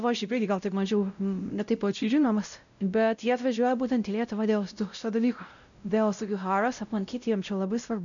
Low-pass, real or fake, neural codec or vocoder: 7.2 kHz; fake; codec, 16 kHz, 0.5 kbps, X-Codec, WavLM features, trained on Multilingual LibriSpeech